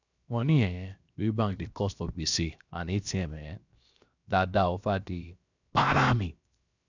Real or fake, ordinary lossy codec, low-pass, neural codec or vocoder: fake; none; 7.2 kHz; codec, 16 kHz, 0.7 kbps, FocalCodec